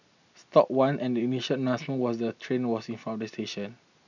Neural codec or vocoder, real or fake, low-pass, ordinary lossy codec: none; real; 7.2 kHz; none